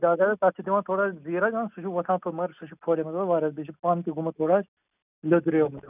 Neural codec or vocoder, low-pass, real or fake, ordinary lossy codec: none; 3.6 kHz; real; none